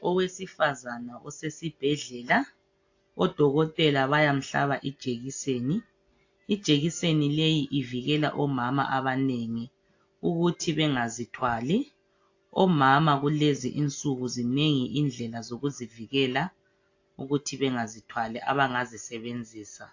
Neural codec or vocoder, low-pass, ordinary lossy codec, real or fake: none; 7.2 kHz; AAC, 48 kbps; real